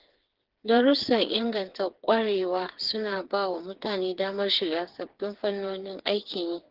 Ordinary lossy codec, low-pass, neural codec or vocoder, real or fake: Opus, 16 kbps; 5.4 kHz; codec, 16 kHz, 4 kbps, FreqCodec, smaller model; fake